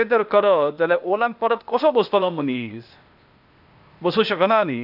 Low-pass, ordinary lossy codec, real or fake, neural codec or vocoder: 5.4 kHz; none; fake; codec, 16 kHz, 0.8 kbps, ZipCodec